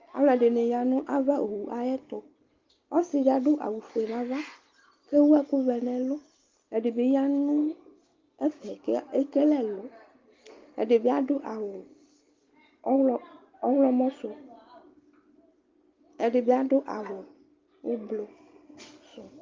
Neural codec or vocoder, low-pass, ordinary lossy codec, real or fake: none; 7.2 kHz; Opus, 32 kbps; real